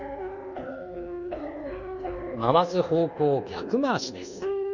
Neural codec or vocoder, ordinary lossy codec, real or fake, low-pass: codec, 24 kHz, 1.2 kbps, DualCodec; none; fake; 7.2 kHz